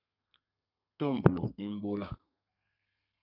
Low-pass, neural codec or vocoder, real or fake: 5.4 kHz; codec, 32 kHz, 1.9 kbps, SNAC; fake